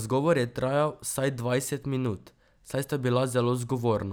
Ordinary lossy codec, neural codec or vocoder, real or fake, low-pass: none; none; real; none